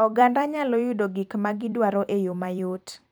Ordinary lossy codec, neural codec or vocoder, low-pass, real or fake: none; vocoder, 44.1 kHz, 128 mel bands every 256 samples, BigVGAN v2; none; fake